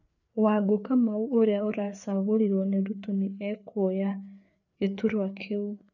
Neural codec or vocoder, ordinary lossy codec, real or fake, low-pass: codec, 16 kHz, 4 kbps, FreqCodec, larger model; none; fake; 7.2 kHz